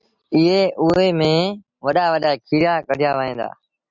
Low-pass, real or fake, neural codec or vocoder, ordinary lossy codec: 7.2 kHz; real; none; Opus, 64 kbps